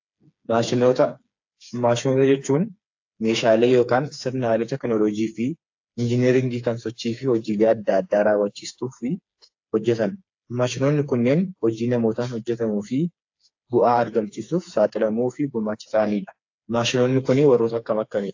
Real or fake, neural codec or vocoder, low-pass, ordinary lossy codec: fake; codec, 16 kHz, 4 kbps, FreqCodec, smaller model; 7.2 kHz; AAC, 48 kbps